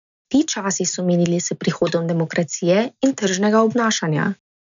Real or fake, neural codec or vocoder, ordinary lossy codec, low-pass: real; none; none; 7.2 kHz